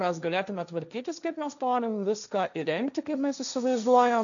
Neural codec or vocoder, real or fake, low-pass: codec, 16 kHz, 1.1 kbps, Voila-Tokenizer; fake; 7.2 kHz